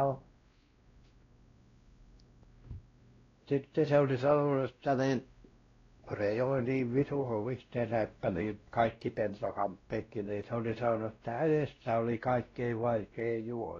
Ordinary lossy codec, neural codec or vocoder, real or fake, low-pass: AAC, 32 kbps; codec, 16 kHz, 1 kbps, X-Codec, WavLM features, trained on Multilingual LibriSpeech; fake; 7.2 kHz